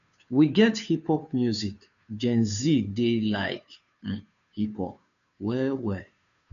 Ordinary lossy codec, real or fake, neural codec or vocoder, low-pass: none; fake; codec, 16 kHz, 2 kbps, FunCodec, trained on Chinese and English, 25 frames a second; 7.2 kHz